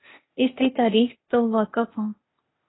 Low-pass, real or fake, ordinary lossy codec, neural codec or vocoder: 7.2 kHz; fake; AAC, 16 kbps; codec, 16 kHz, 0.8 kbps, ZipCodec